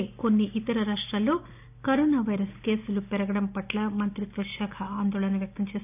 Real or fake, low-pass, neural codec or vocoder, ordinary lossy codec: real; 3.6 kHz; none; none